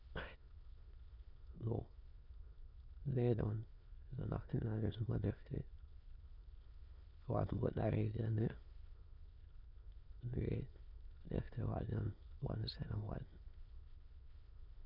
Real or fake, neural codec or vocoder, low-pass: fake; autoencoder, 22.05 kHz, a latent of 192 numbers a frame, VITS, trained on many speakers; 5.4 kHz